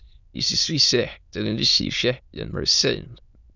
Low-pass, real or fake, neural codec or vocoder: 7.2 kHz; fake; autoencoder, 22.05 kHz, a latent of 192 numbers a frame, VITS, trained on many speakers